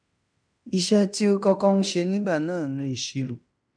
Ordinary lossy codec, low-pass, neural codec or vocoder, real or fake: none; 9.9 kHz; codec, 16 kHz in and 24 kHz out, 0.9 kbps, LongCat-Audio-Codec, fine tuned four codebook decoder; fake